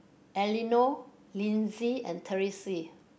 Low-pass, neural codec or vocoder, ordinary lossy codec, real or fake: none; none; none; real